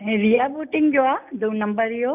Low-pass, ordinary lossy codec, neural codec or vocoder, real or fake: 3.6 kHz; none; none; real